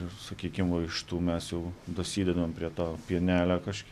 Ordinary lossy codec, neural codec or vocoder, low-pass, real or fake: AAC, 96 kbps; none; 14.4 kHz; real